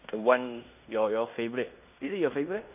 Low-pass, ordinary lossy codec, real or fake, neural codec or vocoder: 3.6 kHz; none; fake; codec, 16 kHz in and 24 kHz out, 0.9 kbps, LongCat-Audio-Codec, fine tuned four codebook decoder